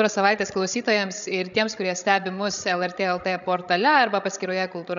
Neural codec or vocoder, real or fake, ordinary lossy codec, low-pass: codec, 16 kHz, 16 kbps, FreqCodec, larger model; fake; MP3, 96 kbps; 7.2 kHz